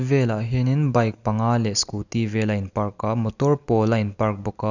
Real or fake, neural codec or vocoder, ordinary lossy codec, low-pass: real; none; none; 7.2 kHz